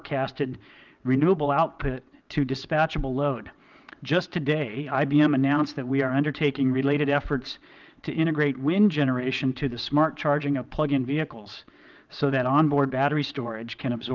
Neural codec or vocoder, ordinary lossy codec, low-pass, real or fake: vocoder, 22.05 kHz, 80 mel bands, WaveNeXt; Opus, 32 kbps; 7.2 kHz; fake